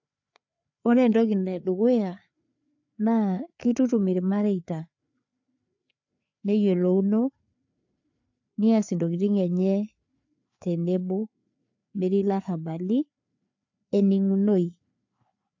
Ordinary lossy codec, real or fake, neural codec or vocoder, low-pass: AAC, 48 kbps; fake; codec, 16 kHz, 4 kbps, FreqCodec, larger model; 7.2 kHz